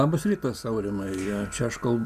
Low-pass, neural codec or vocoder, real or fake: 14.4 kHz; none; real